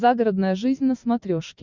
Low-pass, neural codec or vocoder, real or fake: 7.2 kHz; none; real